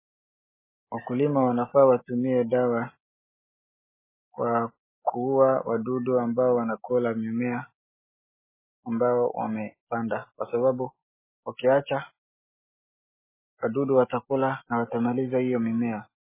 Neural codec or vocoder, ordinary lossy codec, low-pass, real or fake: none; MP3, 16 kbps; 3.6 kHz; real